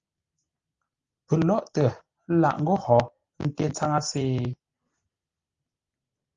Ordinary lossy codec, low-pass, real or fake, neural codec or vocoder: Opus, 32 kbps; 7.2 kHz; real; none